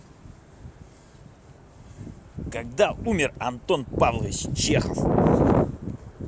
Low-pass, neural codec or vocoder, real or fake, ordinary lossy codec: none; none; real; none